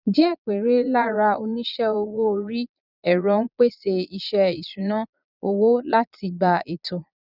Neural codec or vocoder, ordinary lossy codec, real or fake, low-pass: vocoder, 22.05 kHz, 80 mel bands, Vocos; none; fake; 5.4 kHz